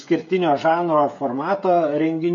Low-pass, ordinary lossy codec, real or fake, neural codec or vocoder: 7.2 kHz; MP3, 48 kbps; fake; codec, 16 kHz, 16 kbps, FreqCodec, smaller model